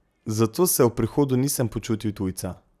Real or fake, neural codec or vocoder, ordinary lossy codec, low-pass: real; none; none; 14.4 kHz